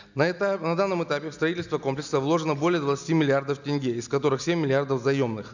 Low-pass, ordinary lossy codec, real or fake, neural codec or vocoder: 7.2 kHz; none; real; none